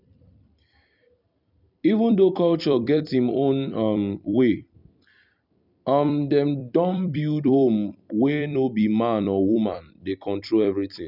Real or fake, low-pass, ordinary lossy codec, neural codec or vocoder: fake; 5.4 kHz; none; vocoder, 44.1 kHz, 128 mel bands every 256 samples, BigVGAN v2